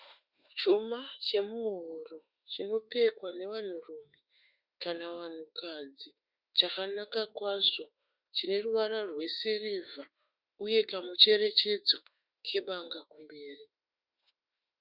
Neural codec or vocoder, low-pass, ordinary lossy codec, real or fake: autoencoder, 48 kHz, 32 numbers a frame, DAC-VAE, trained on Japanese speech; 5.4 kHz; Opus, 64 kbps; fake